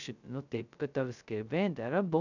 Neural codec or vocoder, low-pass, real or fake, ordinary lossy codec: codec, 16 kHz, 0.2 kbps, FocalCodec; 7.2 kHz; fake; none